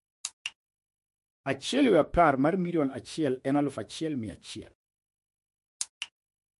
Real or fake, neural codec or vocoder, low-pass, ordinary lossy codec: fake; autoencoder, 48 kHz, 32 numbers a frame, DAC-VAE, trained on Japanese speech; 14.4 kHz; MP3, 48 kbps